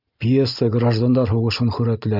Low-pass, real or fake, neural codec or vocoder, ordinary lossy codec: 5.4 kHz; real; none; AAC, 48 kbps